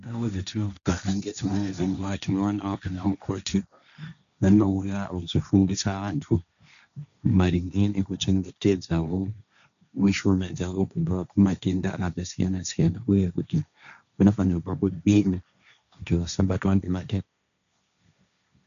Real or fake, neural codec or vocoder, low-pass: fake; codec, 16 kHz, 1.1 kbps, Voila-Tokenizer; 7.2 kHz